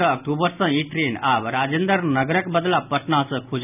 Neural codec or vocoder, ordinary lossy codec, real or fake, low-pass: none; none; real; 3.6 kHz